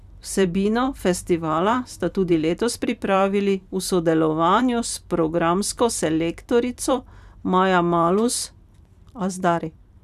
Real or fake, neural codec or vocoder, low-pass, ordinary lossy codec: fake; vocoder, 44.1 kHz, 128 mel bands every 512 samples, BigVGAN v2; 14.4 kHz; none